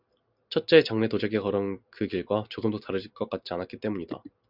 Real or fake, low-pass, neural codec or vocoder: real; 5.4 kHz; none